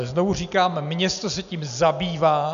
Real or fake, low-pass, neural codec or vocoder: real; 7.2 kHz; none